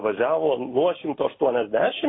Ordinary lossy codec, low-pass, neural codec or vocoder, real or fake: AAC, 16 kbps; 7.2 kHz; none; real